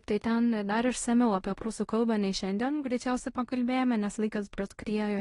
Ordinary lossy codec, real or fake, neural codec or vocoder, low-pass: AAC, 32 kbps; fake; codec, 16 kHz in and 24 kHz out, 0.9 kbps, LongCat-Audio-Codec, fine tuned four codebook decoder; 10.8 kHz